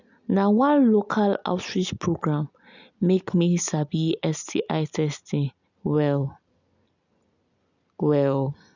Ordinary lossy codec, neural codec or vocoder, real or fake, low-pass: none; none; real; 7.2 kHz